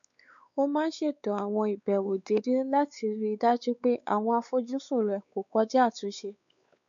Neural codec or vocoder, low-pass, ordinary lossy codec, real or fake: codec, 16 kHz, 4 kbps, X-Codec, WavLM features, trained on Multilingual LibriSpeech; 7.2 kHz; none; fake